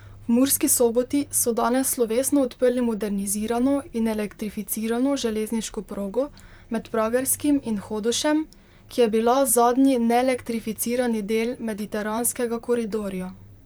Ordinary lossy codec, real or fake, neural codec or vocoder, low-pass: none; fake; vocoder, 44.1 kHz, 128 mel bands, Pupu-Vocoder; none